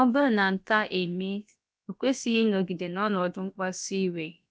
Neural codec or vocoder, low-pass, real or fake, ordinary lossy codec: codec, 16 kHz, about 1 kbps, DyCAST, with the encoder's durations; none; fake; none